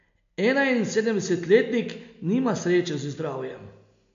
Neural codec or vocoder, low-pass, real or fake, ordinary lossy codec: none; 7.2 kHz; real; AAC, 64 kbps